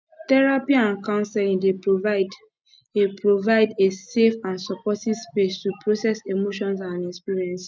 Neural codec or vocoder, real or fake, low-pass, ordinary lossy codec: none; real; none; none